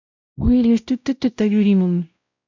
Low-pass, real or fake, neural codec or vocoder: 7.2 kHz; fake; codec, 16 kHz, 0.5 kbps, X-Codec, WavLM features, trained on Multilingual LibriSpeech